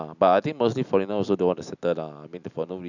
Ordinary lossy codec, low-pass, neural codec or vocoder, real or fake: none; 7.2 kHz; none; real